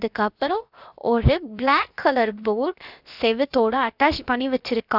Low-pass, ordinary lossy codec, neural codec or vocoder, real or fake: 5.4 kHz; none; codec, 16 kHz, 0.7 kbps, FocalCodec; fake